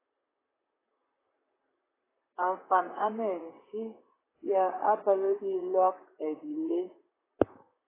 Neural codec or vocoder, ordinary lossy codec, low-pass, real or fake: vocoder, 44.1 kHz, 128 mel bands, Pupu-Vocoder; AAC, 16 kbps; 3.6 kHz; fake